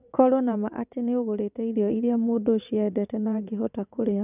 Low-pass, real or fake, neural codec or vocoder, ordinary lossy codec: 3.6 kHz; fake; vocoder, 22.05 kHz, 80 mel bands, WaveNeXt; none